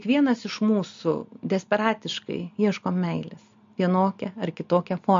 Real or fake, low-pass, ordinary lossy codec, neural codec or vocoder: real; 7.2 kHz; MP3, 48 kbps; none